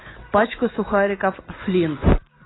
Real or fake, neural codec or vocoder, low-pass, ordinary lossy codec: real; none; 7.2 kHz; AAC, 16 kbps